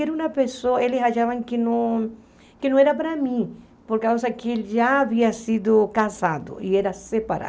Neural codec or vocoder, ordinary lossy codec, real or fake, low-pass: none; none; real; none